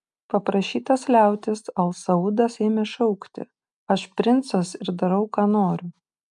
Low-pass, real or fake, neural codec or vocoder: 10.8 kHz; real; none